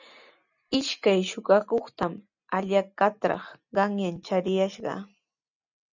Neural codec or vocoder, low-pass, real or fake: none; 7.2 kHz; real